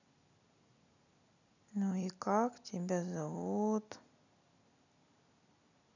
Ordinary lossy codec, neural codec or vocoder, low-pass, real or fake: none; none; 7.2 kHz; real